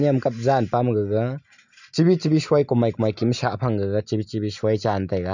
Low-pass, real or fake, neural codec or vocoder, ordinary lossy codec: 7.2 kHz; real; none; none